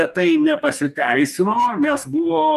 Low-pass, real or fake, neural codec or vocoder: 14.4 kHz; fake; codec, 44.1 kHz, 2.6 kbps, DAC